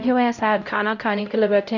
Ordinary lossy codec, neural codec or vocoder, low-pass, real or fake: none; codec, 16 kHz, 0.5 kbps, X-Codec, HuBERT features, trained on LibriSpeech; 7.2 kHz; fake